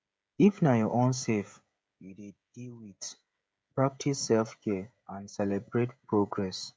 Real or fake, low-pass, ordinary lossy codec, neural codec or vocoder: fake; none; none; codec, 16 kHz, 16 kbps, FreqCodec, smaller model